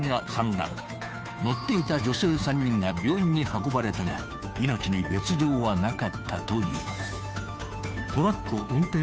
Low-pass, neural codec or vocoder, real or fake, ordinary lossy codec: none; codec, 16 kHz, 2 kbps, FunCodec, trained on Chinese and English, 25 frames a second; fake; none